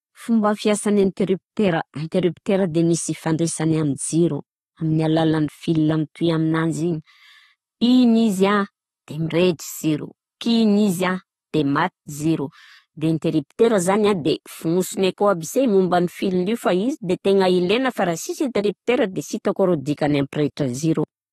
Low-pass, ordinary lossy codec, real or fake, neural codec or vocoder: 14.4 kHz; AAC, 32 kbps; real; none